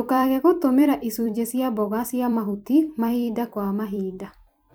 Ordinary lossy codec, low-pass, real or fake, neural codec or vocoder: none; none; fake; vocoder, 44.1 kHz, 128 mel bands every 256 samples, BigVGAN v2